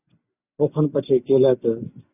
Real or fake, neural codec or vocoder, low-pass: real; none; 3.6 kHz